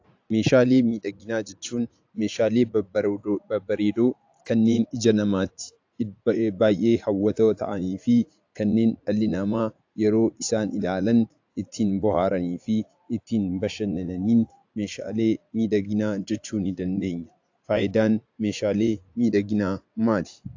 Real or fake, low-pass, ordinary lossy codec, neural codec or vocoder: fake; 7.2 kHz; AAC, 48 kbps; vocoder, 44.1 kHz, 80 mel bands, Vocos